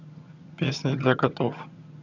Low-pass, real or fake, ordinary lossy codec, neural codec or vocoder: 7.2 kHz; fake; none; vocoder, 22.05 kHz, 80 mel bands, HiFi-GAN